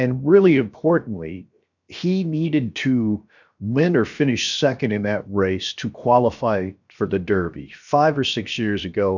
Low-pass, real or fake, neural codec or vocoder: 7.2 kHz; fake; codec, 16 kHz, 0.7 kbps, FocalCodec